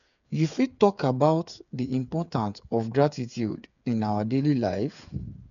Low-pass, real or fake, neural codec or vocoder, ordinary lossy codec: 7.2 kHz; fake; codec, 16 kHz, 8 kbps, FreqCodec, smaller model; none